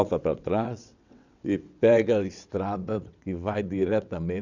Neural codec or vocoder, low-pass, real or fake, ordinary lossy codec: vocoder, 22.05 kHz, 80 mel bands, WaveNeXt; 7.2 kHz; fake; none